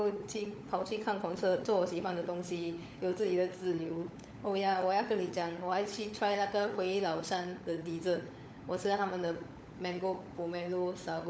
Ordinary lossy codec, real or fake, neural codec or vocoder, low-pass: none; fake; codec, 16 kHz, 16 kbps, FunCodec, trained on LibriTTS, 50 frames a second; none